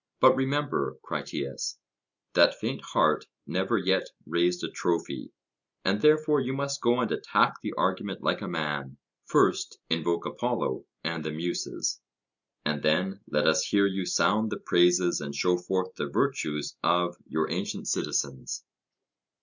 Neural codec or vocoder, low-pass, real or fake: none; 7.2 kHz; real